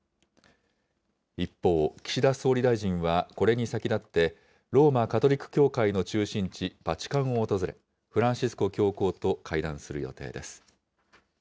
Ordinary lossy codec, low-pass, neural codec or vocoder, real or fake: none; none; none; real